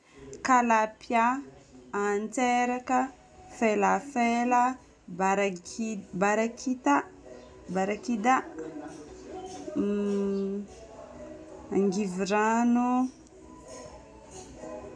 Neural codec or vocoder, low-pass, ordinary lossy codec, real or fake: none; none; none; real